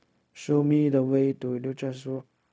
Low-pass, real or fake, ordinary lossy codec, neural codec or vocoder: none; fake; none; codec, 16 kHz, 0.4 kbps, LongCat-Audio-Codec